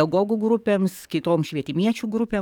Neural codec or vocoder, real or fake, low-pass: codec, 44.1 kHz, 7.8 kbps, DAC; fake; 19.8 kHz